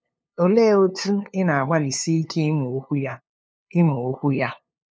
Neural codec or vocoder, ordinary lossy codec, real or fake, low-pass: codec, 16 kHz, 2 kbps, FunCodec, trained on LibriTTS, 25 frames a second; none; fake; none